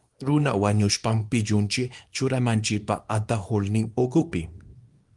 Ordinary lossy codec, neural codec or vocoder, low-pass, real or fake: Opus, 32 kbps; codec, 24 kHz, 0.9 kbps, WavTokenizer, small release; 10.8 kHz; fake